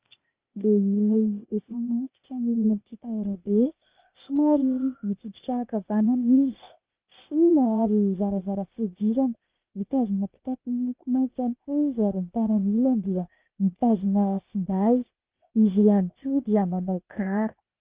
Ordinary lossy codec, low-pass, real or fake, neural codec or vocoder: Opus, 32 kbps; 3.6 kHz; fake; codec, 16 kHz, 0.8 kbps, ZipCodec